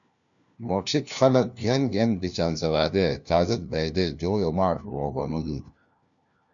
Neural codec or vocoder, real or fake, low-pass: codec, 16 kHz, 1 kbps, FunCodec, trained on LibriTTS, 50 frames a second; fake; 7.2 kHz